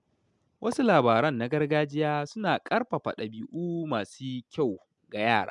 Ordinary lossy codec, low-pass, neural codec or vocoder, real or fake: MP3, 96 kbps; 10.8 kHz; none; real